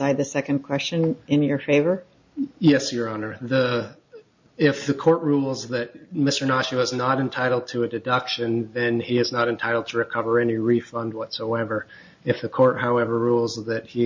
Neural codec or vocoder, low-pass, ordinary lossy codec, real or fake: none; 7.2 kHz; MP3, 48 kbps; real